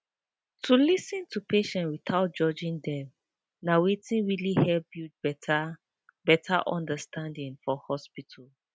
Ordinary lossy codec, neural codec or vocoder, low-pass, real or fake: none; none; none; real